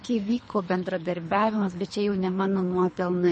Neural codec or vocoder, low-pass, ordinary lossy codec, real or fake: codec, 24 kHz, 3 kbps, HILCodec; 10.8 kHz; MP3, 32 kbps; fake